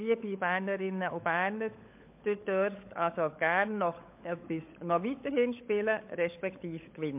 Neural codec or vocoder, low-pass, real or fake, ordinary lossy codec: codec, 16 kHz, 16 kbps, FunCodec, trained on LibriTTS, 50 frames a second; 3.6 kHz; fake; MP3, 32 kbps